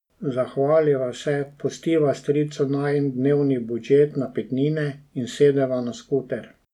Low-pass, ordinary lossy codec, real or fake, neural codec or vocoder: 19.8 kHz; none; real; none